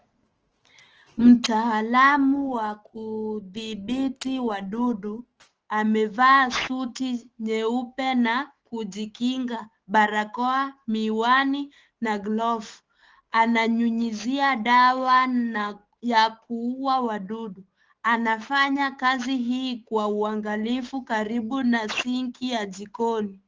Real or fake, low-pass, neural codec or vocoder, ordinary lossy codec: fake; 7.2 kHz; autoencoder, 48 kHz, 128 numbers a frame, DAC-VAE, trained on Japanese speech; Opus, 16 kbps